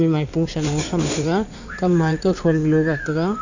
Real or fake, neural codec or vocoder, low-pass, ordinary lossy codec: fake; codec, 16 kHz in and 24 kHz out, 2.2 kbps, FireRedTTS-2 codec; 7.2 kHz; none